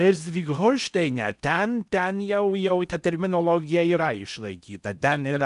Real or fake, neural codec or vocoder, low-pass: fake; codec, 16 kHz in and 24 kHz out, 0.8 kbps, FocalCodec, streaming, 65536 codes; 10.8 kHz